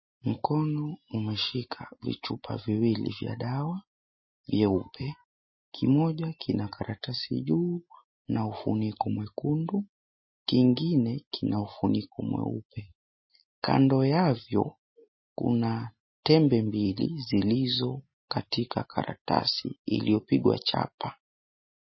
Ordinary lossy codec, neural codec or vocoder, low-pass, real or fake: MP3, 24 kbps; none; 7.2 kHz; real